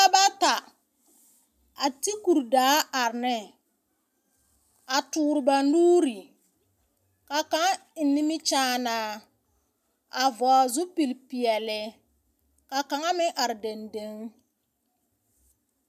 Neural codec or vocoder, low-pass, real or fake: none; 14.4 kHz; real